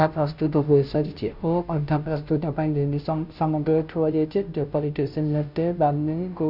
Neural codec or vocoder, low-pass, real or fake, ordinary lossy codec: codec, 16 kHz, 0.5 kbps, FunCodec, trained on Chinese and English, 25 frames a second; 5.4 kHz; fake; none